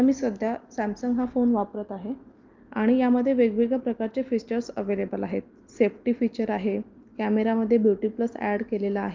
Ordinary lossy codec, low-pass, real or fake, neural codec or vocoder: Opus, 32 kbps; 7.2 kHz; real; none